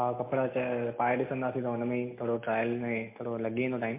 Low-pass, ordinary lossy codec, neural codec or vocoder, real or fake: 3.6 kHz; MP3, 24 kbps; none; real